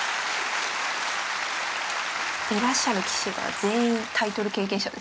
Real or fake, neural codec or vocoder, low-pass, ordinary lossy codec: real; none; none; none